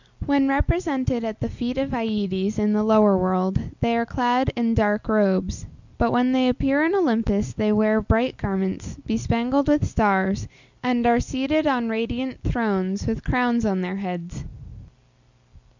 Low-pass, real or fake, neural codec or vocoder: 7.2 kHz; real; none